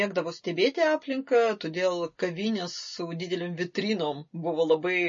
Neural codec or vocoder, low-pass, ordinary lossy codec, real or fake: none; 7.2 kHz; MP3, 32 kbps; real